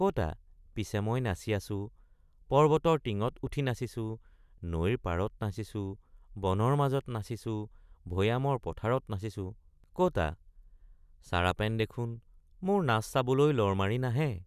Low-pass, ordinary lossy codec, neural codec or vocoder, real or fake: 14.4 kHz; none; none; real